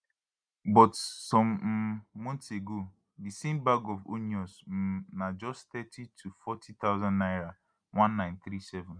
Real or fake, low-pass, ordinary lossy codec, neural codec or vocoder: real; 9.9 kHz; none; none